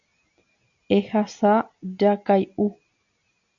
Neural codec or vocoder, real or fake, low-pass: none; real; 7.2 kHz